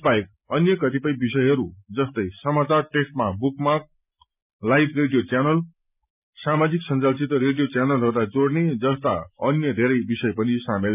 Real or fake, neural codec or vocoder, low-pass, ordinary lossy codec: real; none; 3.6 kHz; none